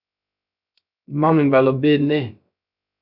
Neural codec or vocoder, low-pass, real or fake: codec, 16 kHz, 0.3 kbps, FocalCodec; 5.4 kHz; fake